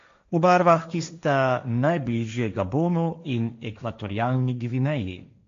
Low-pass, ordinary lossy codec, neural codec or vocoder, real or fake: 7.2 kHz; MP3, 48 kbps; codec, 16 kHz, 1.1 kbps, Voila-Tokenizer; fake